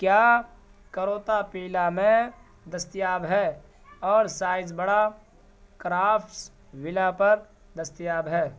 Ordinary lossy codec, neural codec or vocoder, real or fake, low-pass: none; none; real; none